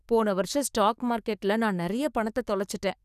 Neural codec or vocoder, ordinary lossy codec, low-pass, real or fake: codec, 44.1 kHz, 7.8 kbps, DAC; none; 14.4 kHz; fake